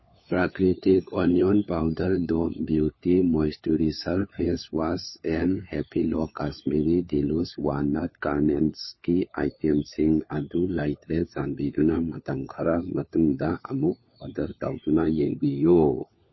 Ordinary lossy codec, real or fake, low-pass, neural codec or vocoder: MP3, 24 kbps; fake; 7.2 kHz; codec, 16 kHz, 4 kbps, FunCodec, trained on LibriTTS, 50 frames a second